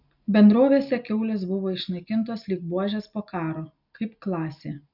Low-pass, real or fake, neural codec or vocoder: 5.4 kHz; real; none